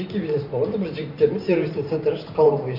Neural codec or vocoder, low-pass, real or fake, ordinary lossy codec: vocoder, 44.1 kHz, 128 mel bands every 512 samples, BigVGAN v2; 5.4 kHz; fake; none